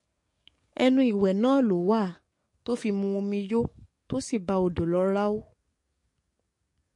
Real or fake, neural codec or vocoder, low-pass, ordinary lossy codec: fake; codec, 44.1 kHz, 7.8 kbps, DAC; 10.8 kHz; MP3, 48 kbps